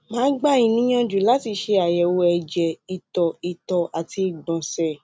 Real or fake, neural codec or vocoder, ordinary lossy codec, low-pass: real; none; none; none